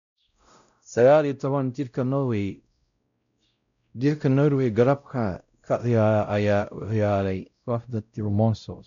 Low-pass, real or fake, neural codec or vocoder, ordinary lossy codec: 7.2 kHz; fake; codec, 16 kHz, 0.5 kbps, X-Codec, WavLM features, trained on Multilingual LibriSpeech; none